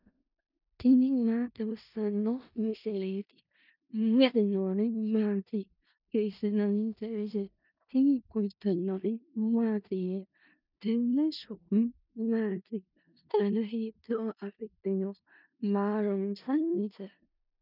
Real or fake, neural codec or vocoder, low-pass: fake; codec, 16 kHz in and 24 kHz out, 0.4 kbps, LongCat-Audio-Codec, four codebook decoder; 5.4 kHz